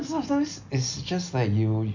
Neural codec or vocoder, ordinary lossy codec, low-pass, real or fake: none; none; 7.2 kHz; real